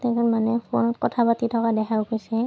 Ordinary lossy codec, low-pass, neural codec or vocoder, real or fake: none; none; none; real